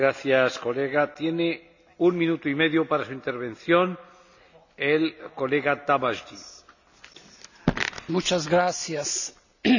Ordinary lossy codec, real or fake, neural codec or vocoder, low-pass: none; real; none; 7.2 kHz